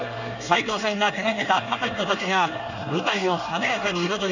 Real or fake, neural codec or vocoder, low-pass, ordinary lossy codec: fake; codec, 24 kHz, 1 kbps, SNAC; 7.2 kHz; none